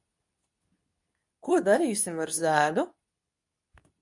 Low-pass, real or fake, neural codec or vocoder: 10.8 kHz; fake; codec, 24 kHz, 0.9 kbps, WavTokenizer, medium speech release version 2